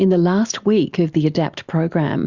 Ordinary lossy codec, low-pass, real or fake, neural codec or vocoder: Opus, 64 kbps; 7.2 kHz; real; none